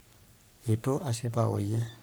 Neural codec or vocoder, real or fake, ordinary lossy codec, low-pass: codec, 44.1 kHz, 3.4 kbps, Pupu-Codec; fake; none; none